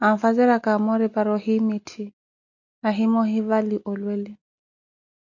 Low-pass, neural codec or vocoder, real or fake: 7.2 kHz; none; real